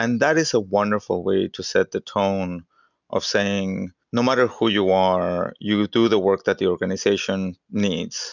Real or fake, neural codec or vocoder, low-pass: real; none; 7.2 kHz